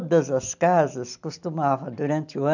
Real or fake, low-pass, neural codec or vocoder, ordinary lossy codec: real; 7.2 kHz; none; none